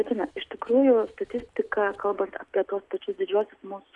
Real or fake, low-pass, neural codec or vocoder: real; 10.8 kHz; none